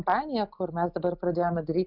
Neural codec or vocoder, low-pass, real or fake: none; 5.4 kHz; real